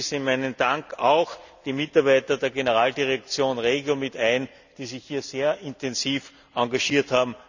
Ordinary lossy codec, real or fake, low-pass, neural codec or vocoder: none; real; 7.2 kHz; none